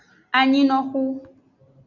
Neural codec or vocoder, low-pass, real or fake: none; 7.2 kHz; real